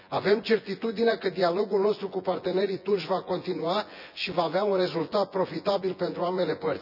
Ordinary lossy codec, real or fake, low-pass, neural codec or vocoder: none; fake; 5.4 kHz; vocoder, 24 kHz, 100 mel bands, Vocos